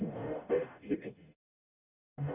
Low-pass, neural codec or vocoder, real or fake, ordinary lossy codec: 3.6 kHz; codec, 44.1 kHz, 0.9 kbps, DAC; fake; none